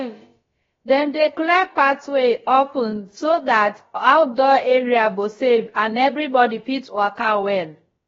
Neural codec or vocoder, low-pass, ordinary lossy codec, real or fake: codec, 16 kHz, about 1 kbps, DyCAST, with the encoder's durations; 7.2 kHz; AAC, 24 kbps; fake